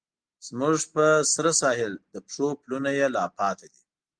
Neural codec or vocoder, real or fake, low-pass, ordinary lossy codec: none; real; 9.9 kHz; Opus, 24 kbps